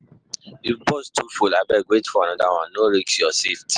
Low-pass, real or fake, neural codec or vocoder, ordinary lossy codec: 9.9 kHz; real; none; Opus, 32 kbps